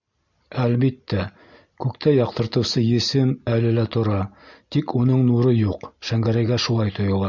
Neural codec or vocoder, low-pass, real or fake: none; 7.2 kHz; real